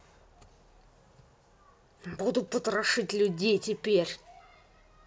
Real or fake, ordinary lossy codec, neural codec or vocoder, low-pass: real; none; none; none